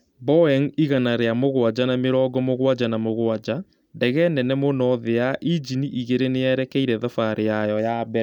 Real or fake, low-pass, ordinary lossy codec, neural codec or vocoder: real; 19.8 kHz; none; none